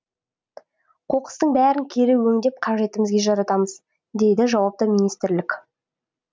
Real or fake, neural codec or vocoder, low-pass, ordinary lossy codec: real; none; none; none